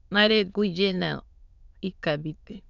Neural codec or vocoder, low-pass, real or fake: autoencoder, 22.05 kHz, a latent of 192 numbers a frame, VITS, trained on many speakers; 7.2 kHz; fake